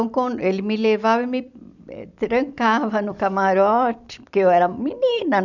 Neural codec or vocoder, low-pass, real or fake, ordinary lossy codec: vocoder, 44.1 kHz, 128 mel bands every 256 samples, BigVGAN v2; 7.2 kHz; fake; none